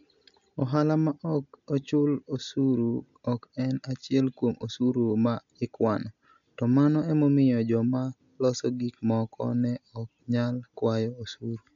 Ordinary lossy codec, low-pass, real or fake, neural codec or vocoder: MP3, 64 kbps; 7.2 kHz; real; none